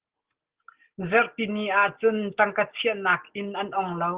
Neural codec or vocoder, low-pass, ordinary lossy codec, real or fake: none; 3.6 kHz; Opus, 16 kbps; real